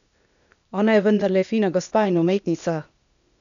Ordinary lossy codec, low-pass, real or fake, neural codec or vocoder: none; 7.2 kHz; fake; codec, 16 kHz, 0.8 kbps, ZipCodec